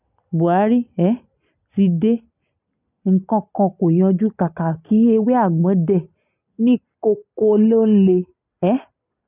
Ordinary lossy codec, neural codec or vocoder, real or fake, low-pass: none; none; real; 3.6 kHz